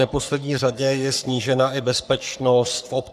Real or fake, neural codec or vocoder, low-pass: fake; codec, 44.1 kHz, 3.4 kbps, Pupu-Codec; 14.4 kHz